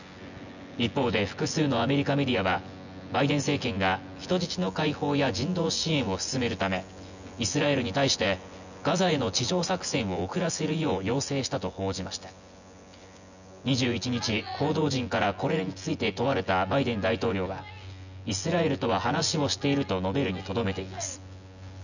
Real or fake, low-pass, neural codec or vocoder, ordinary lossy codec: fake; 7.2 kHz; vocoder, 24 kHz, 100 mel bands, Vocos; none